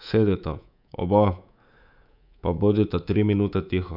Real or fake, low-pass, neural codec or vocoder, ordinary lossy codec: fake; 5.4 kHz; codec, 24 kHz, 3.1 kbps, DualCodec; none